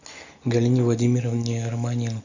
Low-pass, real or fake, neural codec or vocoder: 7.2 kHz; real; none